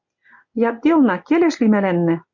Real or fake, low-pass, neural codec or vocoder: real; 7.2 kHz; none